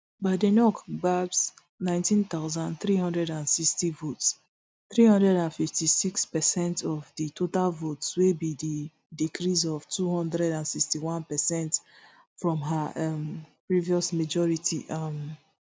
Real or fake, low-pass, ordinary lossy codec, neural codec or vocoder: real; none; none; none